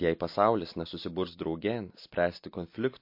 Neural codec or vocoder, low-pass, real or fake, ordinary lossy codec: none; 5.4 kHz; real; MP3, 32 kbps